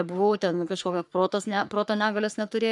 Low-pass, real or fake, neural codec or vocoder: 10.8 kHz; fake; codec, 44.1 kHz, 3.4 kbps, Pupu-Codec